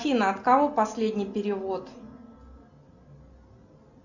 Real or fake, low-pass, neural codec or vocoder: real; 7.2 kHz; none